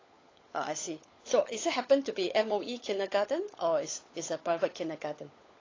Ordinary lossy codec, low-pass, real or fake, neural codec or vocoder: AAC, 32 kbps; 7.2 kHz; fake; codec, 16 kHz, 16 kbps, FunCodec, trained on LibriTTS, 50 frames a second